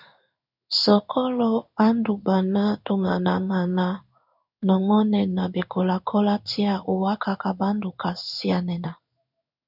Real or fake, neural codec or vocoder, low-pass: fake; codec, 16 kHz in and 24 kHz out, 1 kbps, XY-Tokenizer; 5.4 kHz